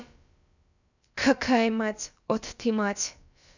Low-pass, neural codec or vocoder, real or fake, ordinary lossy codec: 7.2 kHz; codec, 16 kHz, about 1 kbps, DyCAST, with the encoder's durations; fake; none